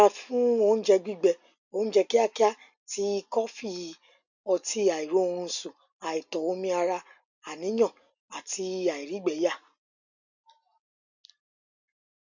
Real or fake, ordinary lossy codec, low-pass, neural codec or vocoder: real; none; 7.2 kHz; none